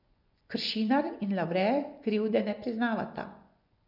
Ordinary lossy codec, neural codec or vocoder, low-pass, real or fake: none; vocoder, 24 kHz, 100 mel bands, Vocos; 5.4 kHz; fake